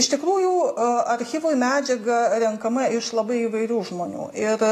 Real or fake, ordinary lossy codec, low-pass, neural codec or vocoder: real; AAC, 48 kbps; 14.4 kHz; none